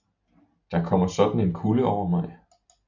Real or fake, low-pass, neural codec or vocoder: real; 7.2 kHz; none